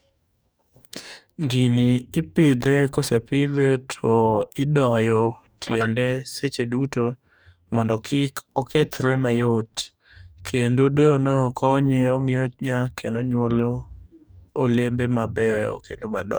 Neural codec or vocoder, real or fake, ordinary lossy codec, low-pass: codec, 44.1 kHz, 2.6 kbps, DAC; fake; none; none